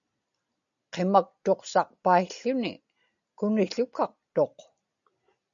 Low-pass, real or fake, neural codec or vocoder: 7.2 kHz; real; none